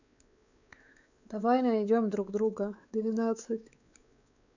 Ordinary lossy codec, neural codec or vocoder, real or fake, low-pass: none; codec, 16 kHz, 4 kbps, X-Codec, WavLM features, trained on Multilingual LibriSpeech; fake; 7.2 kHz